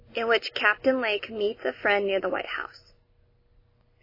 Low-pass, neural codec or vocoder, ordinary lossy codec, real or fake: 5.4 kHz; none; MP3, 24 kbps; real